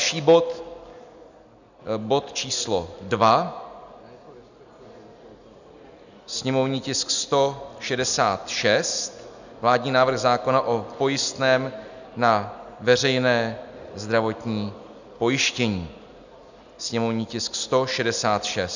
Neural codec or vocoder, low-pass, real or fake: none; 7.2 kHz; real